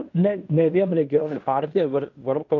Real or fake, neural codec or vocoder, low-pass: fake; codec, 16 kHz in and 24 kHz out, 0.9 kbps, LongCat-Audio-Codec, fine tuned four codebook decoder; 7.2 kHz